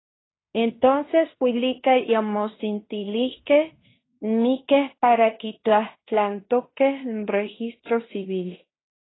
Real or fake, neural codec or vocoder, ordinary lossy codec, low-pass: fake; codec, 16 kHz in and 24 kHz out, 0.9 kbps, LongCat-Audio-Codec, fine tuned four codebook decoder; AAC, 16 kbps; 7.2 kHz